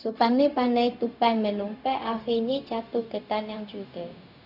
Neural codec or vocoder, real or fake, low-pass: codec, 16 kHz, 0.4 kbps, LongCat-Audio-Codec; fake; 5.4 kHz